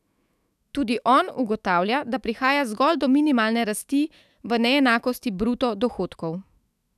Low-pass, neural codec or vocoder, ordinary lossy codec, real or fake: 14.4 kHz; autoencoder, 48 kHz, 128 numbers a frame, DAC-VAE, trained on Japanese speech; none; fake